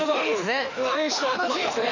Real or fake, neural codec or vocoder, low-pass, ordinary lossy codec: fake; autoencoder, 48 kHz, 32 numbers a frame, DAC-VAE, trained on Japanese speech; 7.2 kHz; none